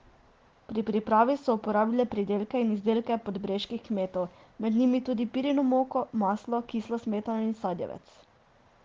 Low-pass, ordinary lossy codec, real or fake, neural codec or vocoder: 7.2 kHz; Opus, 16 kbps; real; none